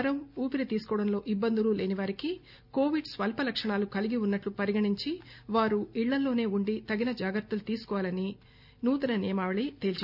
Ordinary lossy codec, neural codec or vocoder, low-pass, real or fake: none; none; 5.4 kHz; real